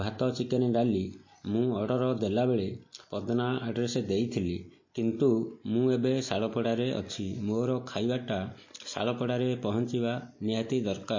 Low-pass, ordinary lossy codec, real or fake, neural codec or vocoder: 7.2 kHz; MP3, 32 kbps; real; none